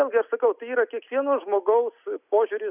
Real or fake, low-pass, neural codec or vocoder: real; 3.6 kHz; none